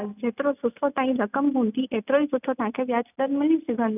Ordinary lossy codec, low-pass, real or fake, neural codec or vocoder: none; 3.6 kHz; real; none